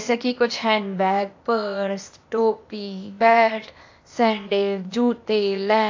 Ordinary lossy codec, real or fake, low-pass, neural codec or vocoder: AAC, 48 kbps; fake; 7.2 kHz; codec, 16 kHz, 0.8 kbps, ZipCodec